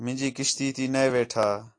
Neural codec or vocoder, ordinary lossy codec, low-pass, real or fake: none; AAC, 48 kbps; 9.9 kHz; real